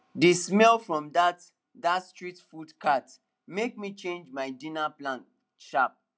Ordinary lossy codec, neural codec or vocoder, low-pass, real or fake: none; none; none; real